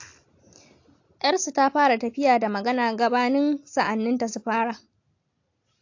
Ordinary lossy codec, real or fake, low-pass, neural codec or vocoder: AAC, 48 kbps; real; 7.2 kHz; none